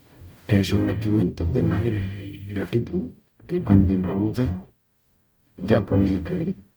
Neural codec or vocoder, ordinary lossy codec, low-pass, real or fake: codec, 44.1 kHz, 0.9 kbps, DAC; none; none; fake